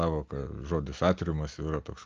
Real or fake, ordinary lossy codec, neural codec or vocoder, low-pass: real; Opus, 32 kbps; none; 7.2 kHz